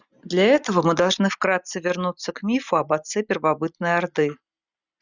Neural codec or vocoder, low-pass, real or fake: none; 7.2 kHz; real